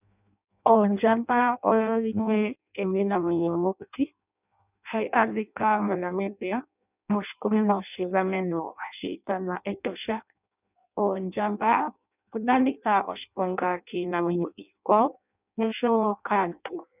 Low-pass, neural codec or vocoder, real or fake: 3.6 kHz; codec, 16 kHz in and 24 kHz out, 0.6 kbps, FireRedTTS-2 codec; fake